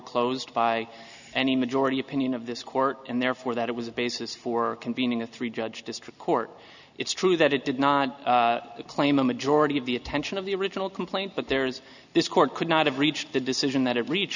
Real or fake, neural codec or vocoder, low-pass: real; none; 7.2 kHz